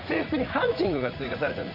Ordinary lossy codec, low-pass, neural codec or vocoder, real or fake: none; 5.4 kHz; vocoder, 44.1 kHz, 80 mel bands, Vocos; fake